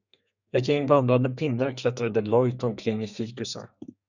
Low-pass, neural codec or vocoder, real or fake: 7.2 kHz; codec, 32 kHz, 1.9 kbps, SNAC; fake